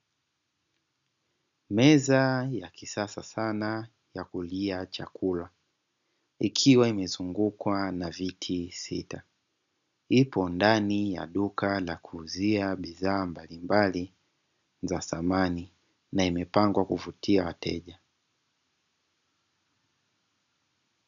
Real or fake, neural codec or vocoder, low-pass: real; none; 7.2 kHz